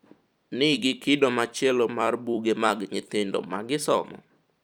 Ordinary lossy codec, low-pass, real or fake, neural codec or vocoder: none; 19.8 kHz; fake; vocoder, 44.1 kHz, 128 mel bands every 256 samples, BigVGAN v2